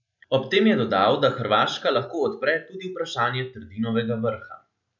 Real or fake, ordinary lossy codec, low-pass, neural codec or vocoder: real; none; 7.2 kHz; none